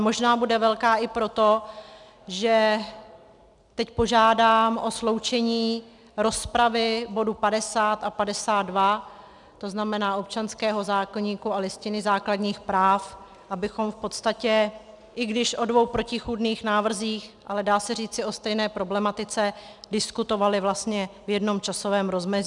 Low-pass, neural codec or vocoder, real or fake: 10.8 kHz; none; real